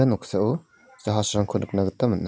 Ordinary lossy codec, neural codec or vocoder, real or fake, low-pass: none; none; real; none